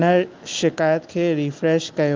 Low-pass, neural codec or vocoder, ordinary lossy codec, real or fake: 7.2 kHz; none; Opus, 32 kbps; real